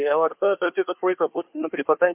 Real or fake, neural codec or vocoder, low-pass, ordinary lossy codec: fake; codec, 24 kHz, 1 kbps, SNAC; 3.6 kHz; MP3, 32 kbps